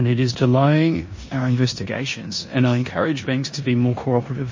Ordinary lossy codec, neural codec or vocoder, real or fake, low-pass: MP3, 32 kbps; codec, 16 kHz in and 24 kHz out, 0.9 kbps, LongCat-Audio-Codec, four codebook decoder; fake; 7.2 kHz